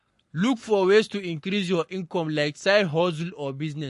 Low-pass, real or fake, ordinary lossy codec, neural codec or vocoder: 14.4 kHz; fake; MP3, 48 kbps; codec, 44.1 kHz, 7.8 kbps, Pupu-Codec